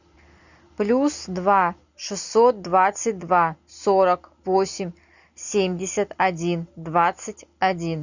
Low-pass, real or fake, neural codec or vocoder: 7.2 kHz; real; none